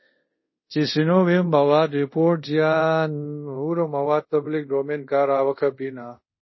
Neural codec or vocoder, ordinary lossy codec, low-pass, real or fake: codec, 24 kHz, 0.5 kbps, DualCodec; MP3, 24 kbps; 7.2 kHz; fake